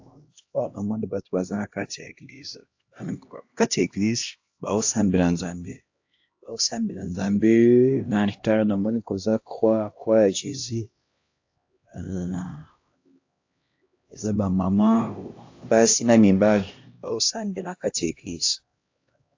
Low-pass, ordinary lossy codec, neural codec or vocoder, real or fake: 7.2 kHz; AAC, 48 kbps; codec, 16 kHz, 1 kbps, X-Codec, HuBERT features, trained on LibriSpeech; fake